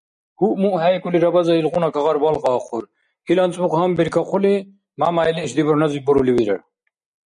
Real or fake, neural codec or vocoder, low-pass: real; none; 9.9 kHz